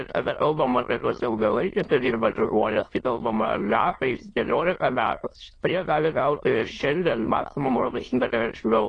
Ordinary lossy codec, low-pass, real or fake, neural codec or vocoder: AAC, 32 kbps; 9.9 kHz; fake; autoencoder, 22.05 kHz, a latent of 192 numbers a frame, VITS, trained on many speakers